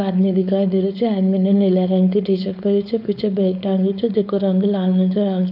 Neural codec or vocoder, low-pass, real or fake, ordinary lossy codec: codec, 16 kHz, 4.8 kbps, FACodec; 5.4 kHz; fake; none